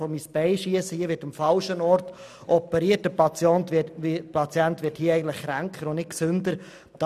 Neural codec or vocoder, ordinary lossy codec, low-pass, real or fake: none; none; 14.4 kHz; real